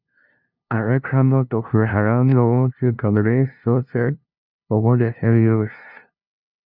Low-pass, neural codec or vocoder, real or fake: 5.4 kHz; codec, 16 kHz, 0.5 kbps, FunCodec, trained on LibriTTS, 25 frames a second; fake